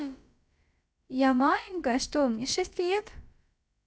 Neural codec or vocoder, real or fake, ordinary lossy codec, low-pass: codec, 16 kHz, about 1 kbps, DyCAST, with the encoder's durations; fake; none; none